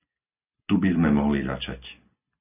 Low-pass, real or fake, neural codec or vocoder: 3.6 kHz; real; none